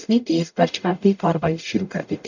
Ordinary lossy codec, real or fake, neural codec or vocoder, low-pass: none; fake; codec, 44.1 kHz, 0.9 kbps, DAC; 7.2 kHz